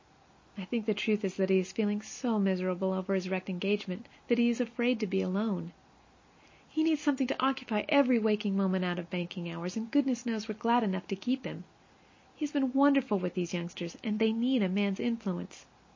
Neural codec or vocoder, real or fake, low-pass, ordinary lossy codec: none; real; 7.2 kHz; MP3, 32 kbps